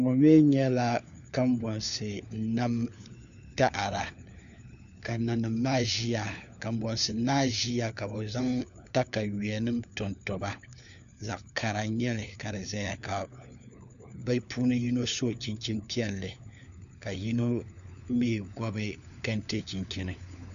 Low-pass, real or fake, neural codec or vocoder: 7.2 kHz; fake; codec, 16 kHz, 4 kbps, FunCodec, trained on LibriTTS, 50 frames a second